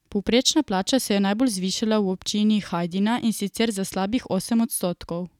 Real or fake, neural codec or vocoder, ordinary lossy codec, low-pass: real; none; none; 19.8 kHz